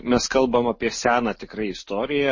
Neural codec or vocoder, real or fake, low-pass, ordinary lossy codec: none; real; 7.2 kHz; MP3, 32 kbps